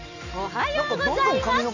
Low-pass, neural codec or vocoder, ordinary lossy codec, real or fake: 7.2 kHz; none; none; real